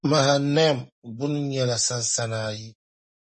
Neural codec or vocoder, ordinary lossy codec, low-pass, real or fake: none; MP3, 32 kbps; 9.9 kHz; real